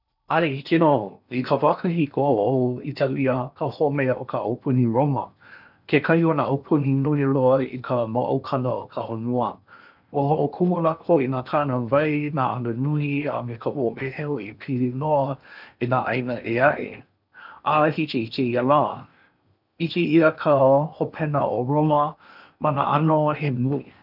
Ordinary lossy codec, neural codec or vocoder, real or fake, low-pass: none; codec, 16 kHz in and 24 kHz out, 0.8 kbps, FocalCodec, streaming, 65536 codes; fake; 5.4 kHz